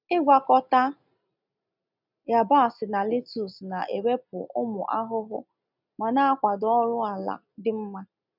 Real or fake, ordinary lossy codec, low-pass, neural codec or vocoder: real; none; 5.4 kHz; none